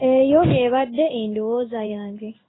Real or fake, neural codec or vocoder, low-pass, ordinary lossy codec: fake; codec, 16 kHz in and 24 kHz out, 1 kbps, XY-Tokenizer; 7.2 kHz; AAC, 16 kbps